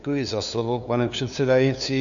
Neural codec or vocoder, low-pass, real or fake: codec, 16 kHz, 2 kbps, FunCodec, trained on LibriTTS, 25 frames a second; 7.2 kHz; fake